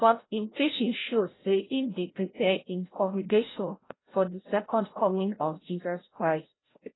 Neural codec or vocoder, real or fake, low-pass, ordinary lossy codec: codec, 16 kHz, 0.5 kbps, FreqCodec, larger model; fake; 7.2 kHz; AAC, 16 kbps